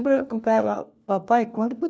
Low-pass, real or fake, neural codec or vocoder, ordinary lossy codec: none; fake; codec, 16 kHz, 1 kbps, FunCodec, trained on LibriTTS, 50 frames a second; none